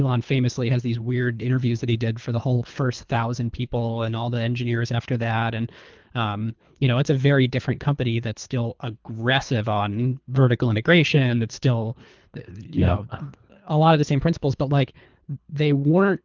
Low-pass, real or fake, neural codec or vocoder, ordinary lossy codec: 7.2 kHz; fake; codec, 24 kHz, 3 kbps, HILCodec; Opus, 32 kbps